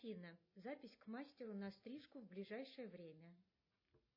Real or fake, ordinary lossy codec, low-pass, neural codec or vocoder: real; MP3, 24 kbps; 5.4 kHz; none